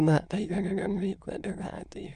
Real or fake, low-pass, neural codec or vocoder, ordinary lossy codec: fake; 9.9 kHz; autoencoder, 22.05 kHz, a latent of 192 numbers a frame, VITS, trained on many speakers; MP3, 96 kbps